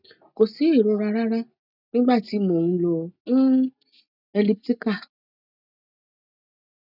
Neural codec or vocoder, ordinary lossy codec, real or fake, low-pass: none; none; real; 5.4 kHz